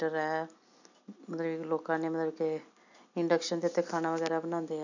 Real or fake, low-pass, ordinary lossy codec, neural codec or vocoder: real; 7.2 kHz; none; none